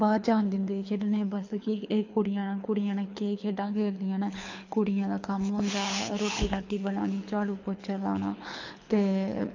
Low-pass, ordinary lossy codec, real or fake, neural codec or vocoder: 7.2 kHz; AAC, 48 kbps; fake; codec, 24 kHz, 6 kbps, HILCodec